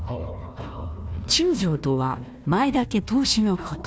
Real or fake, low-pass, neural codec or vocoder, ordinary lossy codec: fake; none; codec, 16 kHz, 1 kbps, FunCodec, trained on Chinese and English, 50 frames a second; none